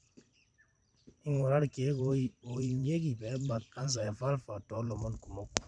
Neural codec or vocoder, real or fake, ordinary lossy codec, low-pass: vocoder, 44.1 kHz, 128 mel bands every 512 samples, BigVGAN v2; fake; none; 9.9 kHz